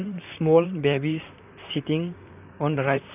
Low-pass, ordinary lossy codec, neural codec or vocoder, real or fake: 3.6 kHz; none; vocoder, 44.1 kHz, 128 mel bands, Pupu-Vocoder; fake